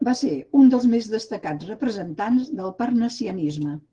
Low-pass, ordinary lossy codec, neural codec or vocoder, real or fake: 9.9 kHz; Opus, 16 kbps; none; real